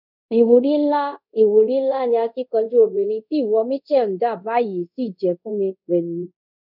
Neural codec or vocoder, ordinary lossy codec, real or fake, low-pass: codec, 24 kHz, 0.5 kbps, DualCodec; none; fake; 5.4 kHz